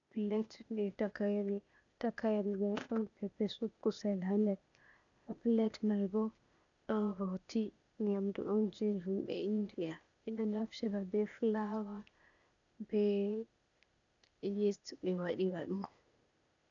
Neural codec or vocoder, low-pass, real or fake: codec, 16 kHz, 0.8 kbps, ZipCodec; 7.2 kHz; fake